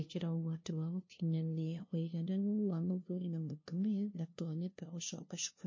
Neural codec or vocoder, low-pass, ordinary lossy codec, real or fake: codec, 16 kHz, 0.5 kbps, FunCodec, trained on LibriTTS, 25 frames a second; 7.2 kHz; MP3, 32 kbps; fake